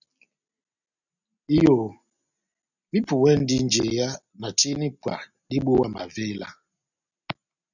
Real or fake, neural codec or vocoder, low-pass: real; none; 7.2 kHz